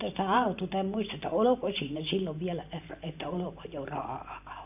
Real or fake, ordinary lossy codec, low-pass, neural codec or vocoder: fake; AAC, 32 kbps; 3.6 kHz; vocoder, 44.1 kHz, 128 mel bands every 512 samples, BigVGAN v2